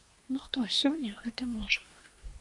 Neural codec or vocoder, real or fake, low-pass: codec, 24 kHz, 1 kbps, SNAC; fake; 10.8 kHz